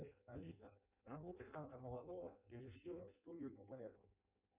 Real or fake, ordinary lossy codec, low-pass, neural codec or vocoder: fake; Opus, 64 kbps; 3.6 kHz; codec, 16 kHz in and 24 kHz out, 0.6 kbps, FireRedTTS-2 codec